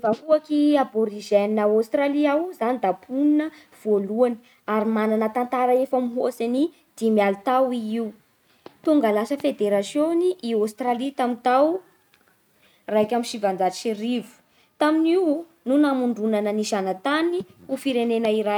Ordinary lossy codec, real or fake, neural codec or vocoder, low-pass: none; real; none; 19.8 kHz